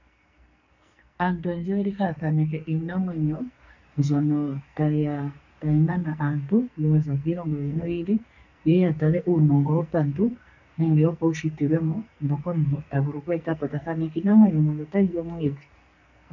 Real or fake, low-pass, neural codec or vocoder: fake; 7.2 kHz; codec, 44.1 kHz, 2.6 kbps, SNAC